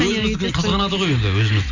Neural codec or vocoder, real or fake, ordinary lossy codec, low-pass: none; real; Opus, 64 kbps; 7.2 kHz